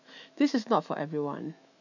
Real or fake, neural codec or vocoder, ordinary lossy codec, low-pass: fake; autoencoder, 48 kHz, 128 numbers a frame, DAC-VAE, trained on Japanese speech; none; 7.2 kHz